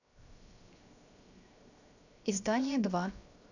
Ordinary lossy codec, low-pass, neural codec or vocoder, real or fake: none; 7.2 kHz; codec, 16 kHz, 0.7 kbps, FocalCodec; fake